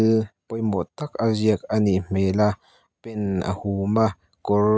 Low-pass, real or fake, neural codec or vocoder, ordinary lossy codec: none; real; none; none